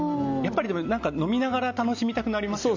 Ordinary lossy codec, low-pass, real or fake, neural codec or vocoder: none; 7.2 kHz; real; none